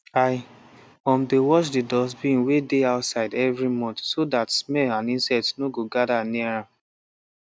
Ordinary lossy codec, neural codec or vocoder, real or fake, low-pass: none; none; real; none